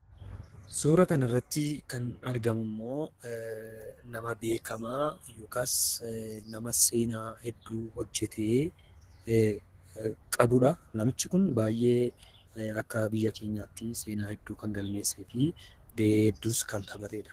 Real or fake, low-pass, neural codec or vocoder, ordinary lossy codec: fake; 14.4 kHz; codec, 32 kHz, 1.9 kbps, SNAC; Opus, 16 kbps